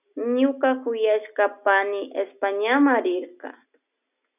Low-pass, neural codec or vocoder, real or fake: 3.6 kHz; none; real